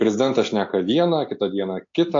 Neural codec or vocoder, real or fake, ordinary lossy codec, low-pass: none; real; AAC, 48 kbps; 7.2 kHz